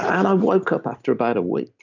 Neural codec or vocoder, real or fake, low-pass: none; real; 7.2 kHz